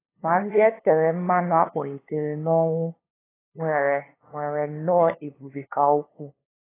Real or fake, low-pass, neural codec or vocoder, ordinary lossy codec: fake; 3.6 kHz; codec, 16 kHz, 2 kbps, FunCodec, trained on LibriTTS, 25 frames a second; AAC, 16 kbps